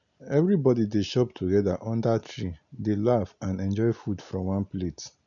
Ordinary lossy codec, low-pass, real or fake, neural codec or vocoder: none; 7.2 kHz; real; none